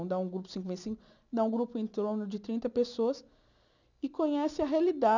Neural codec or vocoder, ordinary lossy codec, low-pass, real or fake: none; none; 7.2 kHz; real